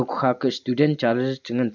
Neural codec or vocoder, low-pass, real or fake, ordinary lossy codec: none; 7.2 kHz; real; none